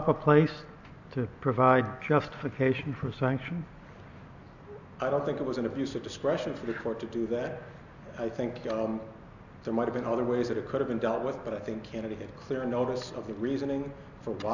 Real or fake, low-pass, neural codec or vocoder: real; 7.2 kHz; none